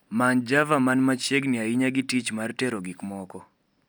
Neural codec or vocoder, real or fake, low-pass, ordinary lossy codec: none; real; none; none